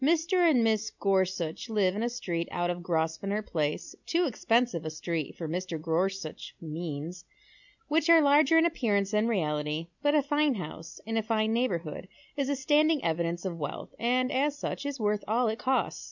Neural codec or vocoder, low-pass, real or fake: none; 7.2 kHz; real